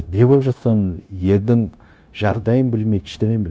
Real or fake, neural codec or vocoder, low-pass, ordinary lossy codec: fake; codec, 16 kHz, 0.9 kbps, LongCat-Audio-Codec; none; none